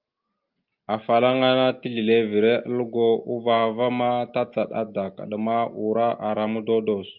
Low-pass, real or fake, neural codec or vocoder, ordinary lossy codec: 5.4 kHz; real; none; Opus, 24 kbps